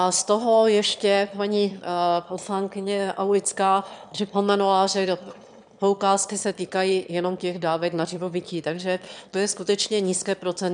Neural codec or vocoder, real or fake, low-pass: autoencoder, 22.05 kHz, a latent of 192 numbers a frame, VITS, trained on one speaker; fake; 9.9 kHz